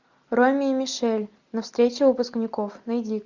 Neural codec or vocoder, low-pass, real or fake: none; 7.2 kHz; real